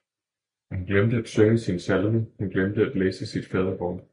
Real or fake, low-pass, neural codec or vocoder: real; 9.9 kHz; none